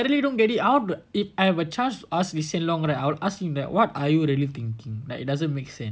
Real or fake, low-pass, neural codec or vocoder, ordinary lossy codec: real; none; none; none